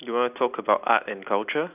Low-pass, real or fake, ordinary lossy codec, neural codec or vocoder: 3.6 kHz; real; none; none